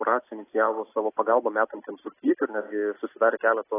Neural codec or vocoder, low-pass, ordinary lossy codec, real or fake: none; 3.6 kHz; AAC, 16 kbps; real